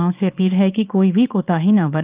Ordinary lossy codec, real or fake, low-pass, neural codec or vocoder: Opus, 32 kbps; fake; 3.6 kHz; codec, 24 kHz, 0.9 kbps, WavTokenizer, small release